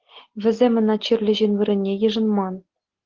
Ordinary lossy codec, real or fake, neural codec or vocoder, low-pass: Opus, 16 kbps; real; none; 7.2 kHz